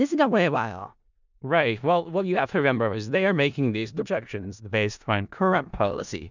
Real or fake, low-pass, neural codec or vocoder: fake; 7.2 kHz; codec, 16 kHz in and 24 kHz out, 0.4 kbps, LongCat-Audio-Codec, four codebook decoder